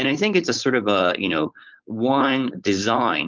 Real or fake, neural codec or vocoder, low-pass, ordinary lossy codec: fake; codec, 16 kHz, 4.8 kbps, FACodec; 7.2 kHz; Opus, 32 kbps